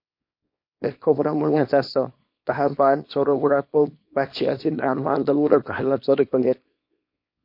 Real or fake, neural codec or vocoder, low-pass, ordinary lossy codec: fake; codec, 24 kHz, 0.9 kbps, WavTokenizer, small release; 5.4 kHz; MP3, 32 kbps